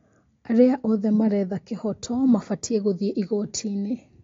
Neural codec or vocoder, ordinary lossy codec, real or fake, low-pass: none; AAC, 32 kbps; real; 7.2 kHz